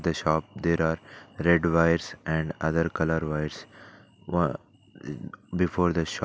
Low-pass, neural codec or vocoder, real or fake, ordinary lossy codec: none; none; real; none